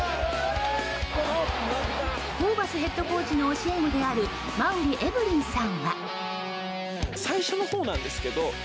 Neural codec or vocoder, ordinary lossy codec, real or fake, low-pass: none; none; real; none